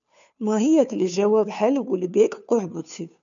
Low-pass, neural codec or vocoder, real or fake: 7.2 kHz; codec, 16 kHz, 2 kbps, FunCodec, trained on Chinese and English, 25 frames a second; fake